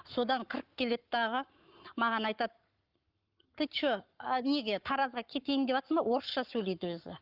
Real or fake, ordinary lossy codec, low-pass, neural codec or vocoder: fake; Opus, 32 kbps; 5.4 kHz; codec, 44.1 kHz, 7.8 kbps, Pupu-Codec